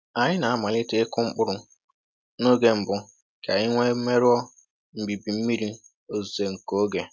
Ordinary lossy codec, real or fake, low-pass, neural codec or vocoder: none; real; none; none